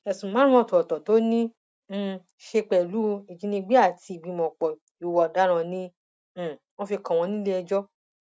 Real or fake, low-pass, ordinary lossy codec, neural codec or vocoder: real; none; none; none